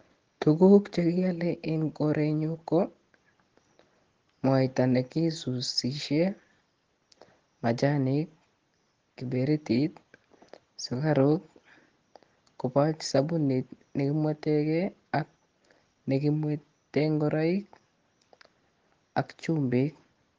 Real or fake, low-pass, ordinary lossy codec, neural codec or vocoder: real; 7.2 kHz; Opus, 16 kbps; none